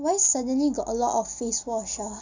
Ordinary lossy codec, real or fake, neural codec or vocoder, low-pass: none; real; none; 7.2 kHz